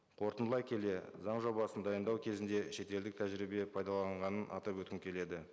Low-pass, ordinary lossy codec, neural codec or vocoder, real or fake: none; none; none; real